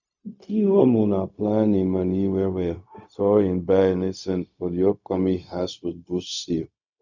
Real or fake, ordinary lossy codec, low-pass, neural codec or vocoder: fake; none; 7.2 kHz; codec, 16 kHz, 0.4 kbps, LongCat-Audio-Codec